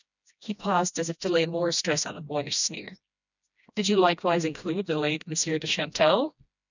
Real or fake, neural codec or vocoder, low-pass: fake; codec, 16 kHz, 1 kbps, FreqCodec, smaller model; 7.2 kHz